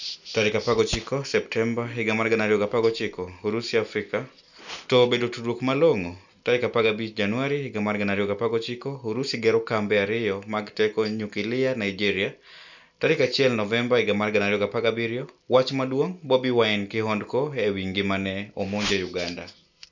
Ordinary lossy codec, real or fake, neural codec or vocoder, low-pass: none; real; none; 7.2 kHz